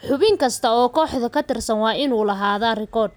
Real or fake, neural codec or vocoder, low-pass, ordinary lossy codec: real; none; none; none